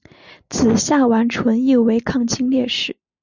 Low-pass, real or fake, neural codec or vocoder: 7.2 kHz; real; none